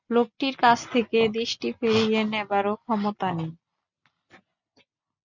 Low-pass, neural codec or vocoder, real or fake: 7.2 kHz; none; real